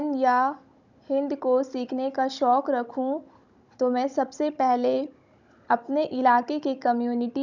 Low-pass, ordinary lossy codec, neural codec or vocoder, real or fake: 7.2 kHz; none; codec, 16 kHz, 16 kbps, FunCodec, trained on Chinese and English, 50 frames a second; fake